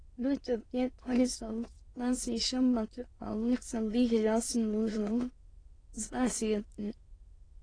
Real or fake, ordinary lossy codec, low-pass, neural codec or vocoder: fake; AAC, 32 kbps; 9.9 kHz; autoencoder, 22.05 kHz, a latent of 192 numbers a frame, VITS, trained on many speakers